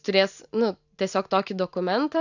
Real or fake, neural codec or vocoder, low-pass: real; none; 7.2 kHz